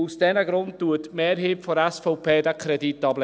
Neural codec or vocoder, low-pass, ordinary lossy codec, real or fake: none; none; none; real